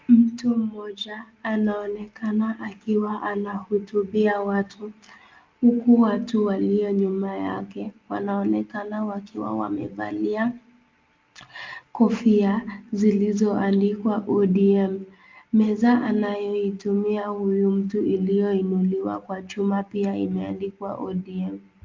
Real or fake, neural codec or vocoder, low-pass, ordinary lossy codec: real; none; 7.2 kHz; Opus, 24 kbps